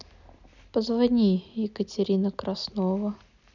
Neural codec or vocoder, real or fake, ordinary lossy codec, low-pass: none; real; none; 7.2 kHz